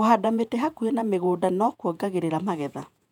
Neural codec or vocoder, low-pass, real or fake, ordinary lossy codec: vocoder, 48 kHz, 128 mel bands, Vocos; 19.8 kHz; fake; none